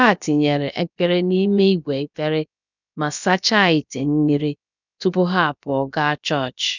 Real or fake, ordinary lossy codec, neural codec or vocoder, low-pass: fake; none; codec, 16 kHz, about 1 kbps, DyCAST, with the encoder's durations; 7.2 kHz